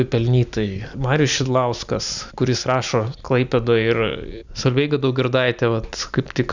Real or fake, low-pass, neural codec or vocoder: real; 7.2 kHz; none